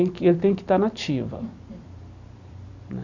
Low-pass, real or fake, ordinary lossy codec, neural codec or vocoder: 7.2 kHz; fake; none; vocoder, 44.1 kHz, 128 mel bands every 256 samples, BigVGAN v2